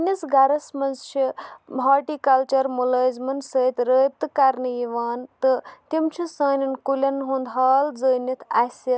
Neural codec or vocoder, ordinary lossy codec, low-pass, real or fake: none; none; none; real